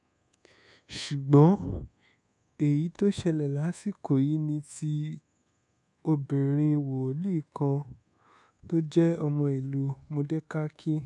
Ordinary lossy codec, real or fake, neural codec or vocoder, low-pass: none; fake; codec, 24 kHz, 1.2 kbps, DualCodec; 10.8 kHz